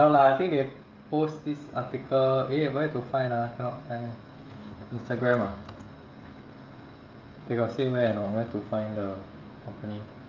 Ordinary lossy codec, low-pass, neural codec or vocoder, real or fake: Opus, 32 kbps; 7.2 kHz; codec, 16 kHz, 16 kbps, FreqCodec, smaller model; fake